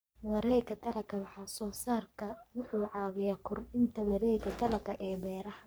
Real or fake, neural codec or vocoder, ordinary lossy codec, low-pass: fake; codec, 44.1 kHz, 3.4 kbps, Pupu-Codec; none; none